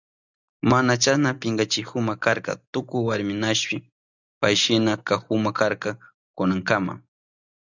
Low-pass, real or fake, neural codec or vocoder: 7.2 kHz; real; none